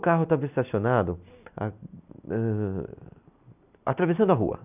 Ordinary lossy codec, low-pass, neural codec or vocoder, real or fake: none; 3.6 kHz; none; real